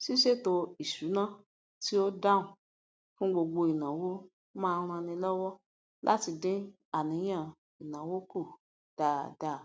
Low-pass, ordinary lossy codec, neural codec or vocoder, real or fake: none; none; none; real